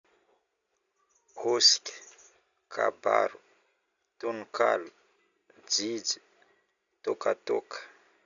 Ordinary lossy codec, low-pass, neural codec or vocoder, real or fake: none; 7.2 kHz; none; real